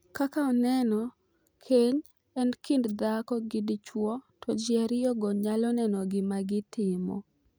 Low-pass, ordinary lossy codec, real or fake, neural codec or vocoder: none; none; real; none